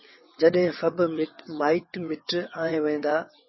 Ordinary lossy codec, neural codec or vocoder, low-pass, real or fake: MP3, 24 kbps; vocoder, 22.05 kHz, 80 mel bands, WaveNeXt; 7.2 kHz; fake